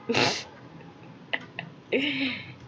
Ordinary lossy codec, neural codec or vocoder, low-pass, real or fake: none; none; none; real